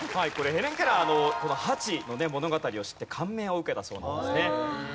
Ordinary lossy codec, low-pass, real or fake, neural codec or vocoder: none; none; real; none